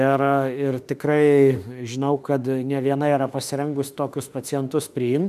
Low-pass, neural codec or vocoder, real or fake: 14.4 kHz; autoencoder, 48 kHz, 32 numbers a frame, DAC-VAE, trained on Japanese speech; fake